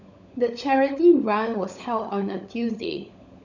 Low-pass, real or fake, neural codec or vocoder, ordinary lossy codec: 7.2 kHz; fake; codec, 16 kHz, 16 kbps, FunCodec, trained on LibriTTS, 50 frames a second; none